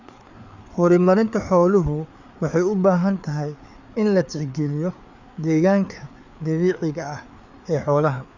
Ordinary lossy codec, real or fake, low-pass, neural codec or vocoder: none; fake; 7.2 kHz; codec, 16 kHz, 4 kbps, FreqCodec, larger model